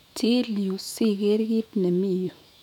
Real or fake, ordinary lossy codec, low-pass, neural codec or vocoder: fake; none; 19.8 kHz; autoencoder, 48 kHz, 128 numbers a frame, DAC-VAE, trained on Japanese speech